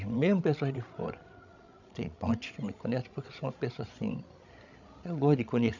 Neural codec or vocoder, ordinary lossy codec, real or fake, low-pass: codec, 16 kHz, 16 kbps, FreqCodec, larger model; none; fake; 7.2 kHz